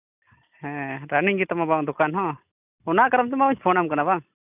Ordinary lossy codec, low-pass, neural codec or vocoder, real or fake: none; 3.6 kHz; none; real